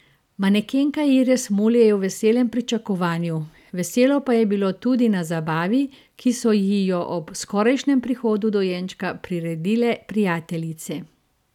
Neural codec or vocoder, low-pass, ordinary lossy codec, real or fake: none; 19.8 kHz; none; real